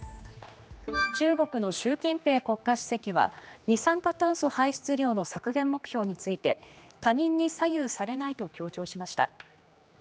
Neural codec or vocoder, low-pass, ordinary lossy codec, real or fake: codec, 16 kHz, 2 kbps, X-Codec, HuBERT features, trained on general audio; none; none; fake